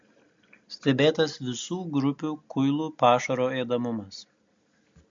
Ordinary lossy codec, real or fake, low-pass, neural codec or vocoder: MP3, 48 kbps; real; 7.2 kHz; none